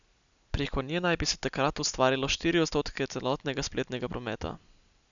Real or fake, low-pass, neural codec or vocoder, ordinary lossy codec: real; 7.2 kHz; none; none